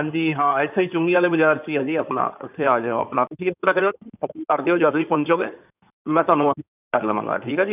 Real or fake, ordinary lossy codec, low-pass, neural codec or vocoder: fake; none; 3.6 kHz; codec, 16 kHz in and 24 kHz out, 2.2 kbps, FireRedTTS-2 codec